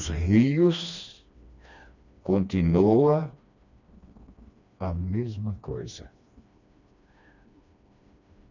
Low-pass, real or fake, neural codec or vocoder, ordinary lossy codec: 7.2 kHz; fake; codec, 16 kHz, 2 kbps, FreqCodec, smaller model; none